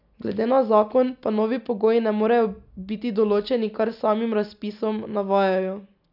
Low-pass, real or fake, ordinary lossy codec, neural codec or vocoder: 5.4 kHz; real; none; none